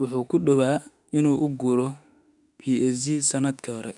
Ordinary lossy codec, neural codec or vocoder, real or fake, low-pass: none; autoencoder, 48 kHz, 32 numbers a frame, DAC-VAE, trained on Japanese speech; fake; 10.8 kHz